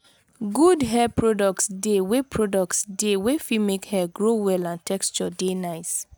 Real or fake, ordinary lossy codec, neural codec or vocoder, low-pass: real; none; none; none